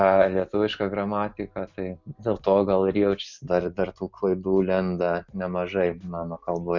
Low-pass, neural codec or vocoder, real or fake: 7.2 kHz; none; real